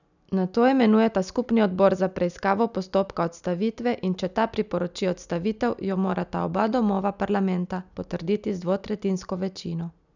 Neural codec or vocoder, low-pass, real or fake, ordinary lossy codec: none; 7.2 kHz; real; none